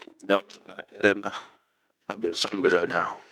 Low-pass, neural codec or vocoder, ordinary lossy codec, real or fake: 19.8 kHz; autoencoder, 48 kHz, 32 numbers a frame, DAC-VAE, trained on Japanese speech; none; fake